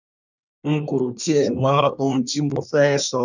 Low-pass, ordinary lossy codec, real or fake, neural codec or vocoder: 7.2 kHz; none; fake; codec, 24 kHz, 1 kbps, SNAC